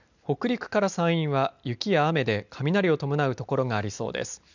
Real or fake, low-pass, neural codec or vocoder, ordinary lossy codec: real; 7.2 kHz; none; none